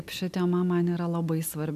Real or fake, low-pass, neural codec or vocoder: real; 14.4 kHz; none